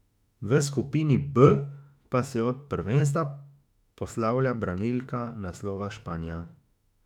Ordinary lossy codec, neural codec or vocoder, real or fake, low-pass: none; autoencoder, 48 kHz, 32 numbers a frame, DAC-VAE, trained on Japanese speech; fake; 19.8 kHz